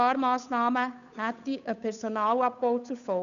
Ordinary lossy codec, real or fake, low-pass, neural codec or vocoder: none; fake; 7.2 kHz; codec, 16 kHz, 8 kbps, FunCodec, trained on Chinese and English, 25 frames a second